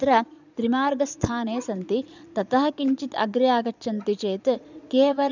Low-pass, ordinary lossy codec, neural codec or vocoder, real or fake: 7.2 kHz; none; vocoder, 22.05 kHz, 80 mel bands, Vocos; fake